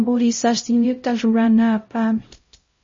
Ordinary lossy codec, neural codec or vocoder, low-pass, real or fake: MP3, 32 kbps; codec, 16 kHz, 0.5 kbps, X-Codec, HuBERT features, trained on LibriSpeech; 7.2 kHz; fake